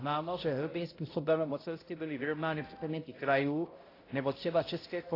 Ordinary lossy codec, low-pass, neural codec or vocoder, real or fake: AAC, 24 kbps; 5.4 kHz; codec, 16 kHz, 0.5 kbps, X-Codec, HuBERT features, trained on balanced general audio; fake